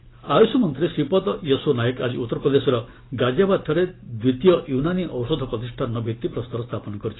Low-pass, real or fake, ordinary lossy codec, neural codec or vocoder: 7.2 kHz; real; AAC, 16 kbps; none